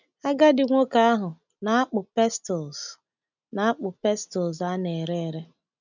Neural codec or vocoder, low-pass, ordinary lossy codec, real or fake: none; 7.2 kHz; none; real